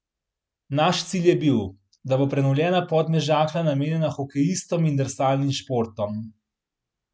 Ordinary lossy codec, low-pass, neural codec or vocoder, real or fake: none; none; none; real